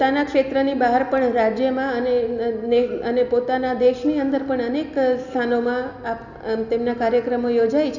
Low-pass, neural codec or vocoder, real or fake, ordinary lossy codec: 7.2 kHz; none; real; none